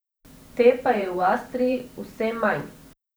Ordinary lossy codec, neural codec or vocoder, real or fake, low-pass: none; vocoder, 44.1 kHz, 128 mel bands every 512 samples, BigVGAN v2; fake; none